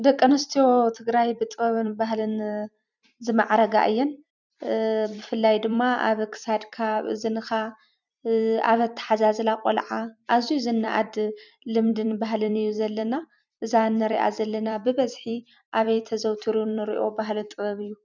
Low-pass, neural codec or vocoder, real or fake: 7.2 kHz; vocoder, 44.1 kHz, 128 mel bands every 256 samples, BigVGAN v2; fake